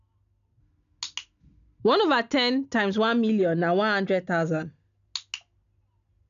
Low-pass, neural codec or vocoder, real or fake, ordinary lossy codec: 7.2 kHz; none; real; none